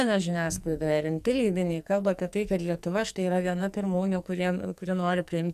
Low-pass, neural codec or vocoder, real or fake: 14.4 kHz; codec, 44.1 kHz, 2.6 kbps, SNAC; fake